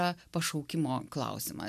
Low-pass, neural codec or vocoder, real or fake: 14.4 kHz; none; real